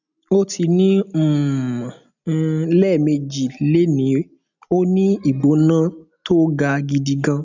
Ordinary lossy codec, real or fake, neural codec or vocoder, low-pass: none; real; none; 7.2 kHz